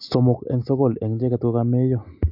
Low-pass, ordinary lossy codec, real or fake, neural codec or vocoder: 5.4 kHz; AAC, 48 kbps; real; none